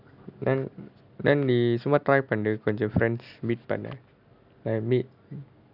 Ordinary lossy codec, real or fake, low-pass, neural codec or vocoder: none; real; 5.4 kHz; none